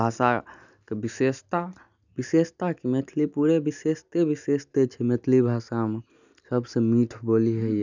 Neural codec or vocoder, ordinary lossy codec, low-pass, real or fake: none; none; 7.2 kHz; real